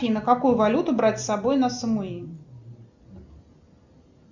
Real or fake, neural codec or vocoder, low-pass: real; none; 7.2 kHz